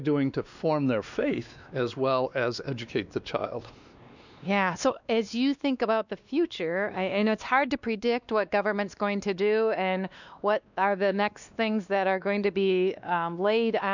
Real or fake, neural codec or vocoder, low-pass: fake; codec, 16 kHz, 2 kbps, X-Codec, WavLM features, trained on Multilingual LibriSpeech; 7.2 kHz